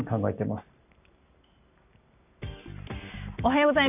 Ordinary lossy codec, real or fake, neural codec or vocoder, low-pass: none; real; none; 3.6 kHz